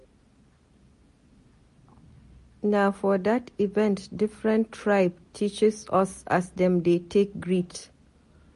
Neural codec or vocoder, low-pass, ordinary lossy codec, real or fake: none; 14.4 kHz; MP3, 48 kbps; real